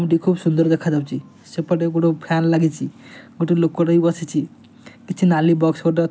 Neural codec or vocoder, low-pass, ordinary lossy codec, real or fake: none; none; none; real